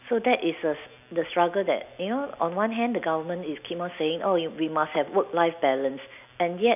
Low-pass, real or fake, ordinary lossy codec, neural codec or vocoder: 3.6 kHz; real; none; none